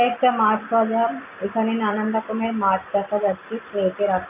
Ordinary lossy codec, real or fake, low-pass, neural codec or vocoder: MP3, 24 kbps; real; 3.6 kHz; none